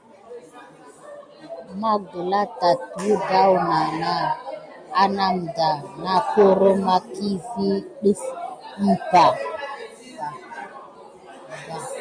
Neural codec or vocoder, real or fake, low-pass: none; real; 9.9 kHz